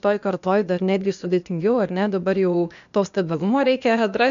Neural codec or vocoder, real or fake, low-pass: codec, 16 kHz, 0.8 kbps, ZipCodec; fake; 7.2 kHz